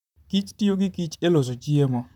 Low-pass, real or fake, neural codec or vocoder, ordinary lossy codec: 19.8 kHz; fake; vocoder, 44.1 kHz, 128 mel bands, Pupu-Vocoder; none